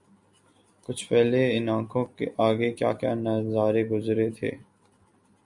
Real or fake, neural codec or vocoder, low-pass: real; none; 10.8 kHz